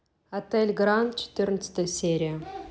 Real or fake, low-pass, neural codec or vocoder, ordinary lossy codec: real; none; none; none